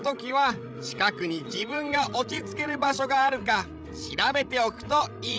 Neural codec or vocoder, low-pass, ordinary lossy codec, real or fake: codec, 16 kHz, 16 kbps, FreqCodec, larger model; none; none; fake